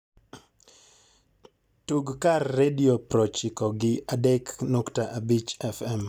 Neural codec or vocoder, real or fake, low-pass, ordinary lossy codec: none; real; 19.8 kHz; none